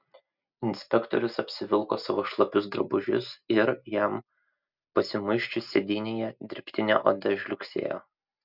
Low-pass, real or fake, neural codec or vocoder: 5.4 kHz; real; none